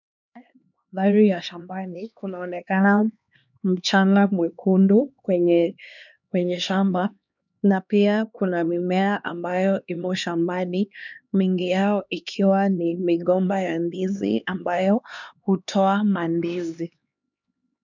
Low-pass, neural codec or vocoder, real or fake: 7.2 kHz; codec, 16 kHz, 2 kbps, X-Codec, HuBERT features, trained on LibriSpeech; fake